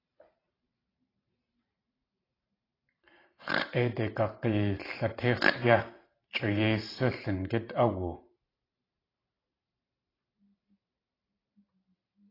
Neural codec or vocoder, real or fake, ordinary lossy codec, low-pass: vocoder, 44.1 kHz, 128 mel bands every 512 samples, BigVGAN v2; fake; AAC, 24 kbps; 5.4 kHz